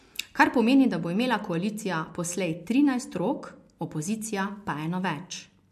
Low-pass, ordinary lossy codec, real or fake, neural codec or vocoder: 14.4 kHz; MP3, 64 kbps; real; none